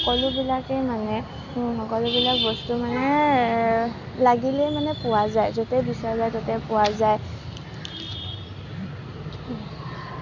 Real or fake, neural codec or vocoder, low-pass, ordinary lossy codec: real; none; 7.2 kHz; none